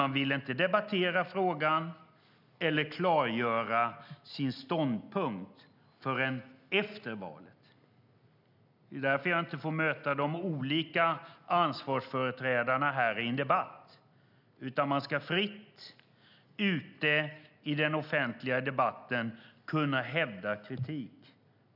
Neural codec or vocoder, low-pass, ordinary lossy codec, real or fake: none; 5.4 kHz; none; real